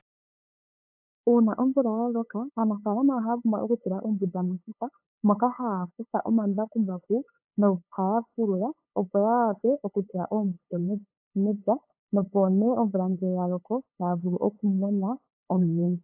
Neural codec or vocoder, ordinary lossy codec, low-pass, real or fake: codec, 16 kHz, 8 kbps, FunCodec, trained on LibriTTS, 25 frames a second; AAC, 32 kbps; 3.6 kHz; fake